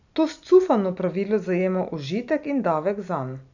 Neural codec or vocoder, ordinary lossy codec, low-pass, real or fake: none; none; 7.2 kHz; real